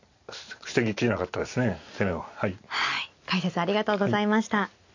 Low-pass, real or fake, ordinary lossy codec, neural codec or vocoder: 7.2 kHz; real; AAC, 48 kbps; none